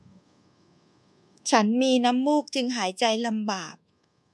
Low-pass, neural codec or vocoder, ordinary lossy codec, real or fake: none; codec, 24 kHz, 1.2 kbps, DualCodec; none; fake